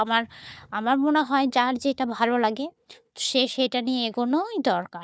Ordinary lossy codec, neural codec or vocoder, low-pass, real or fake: none; codec, 16 kHz, 4 kbps, FunCodec, trained on Chinese and English, 50 frames a second; none; fake